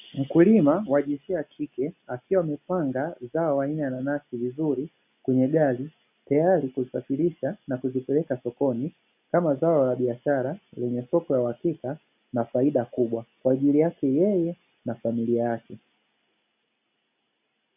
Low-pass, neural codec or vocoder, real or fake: 3.6 kHz; none; real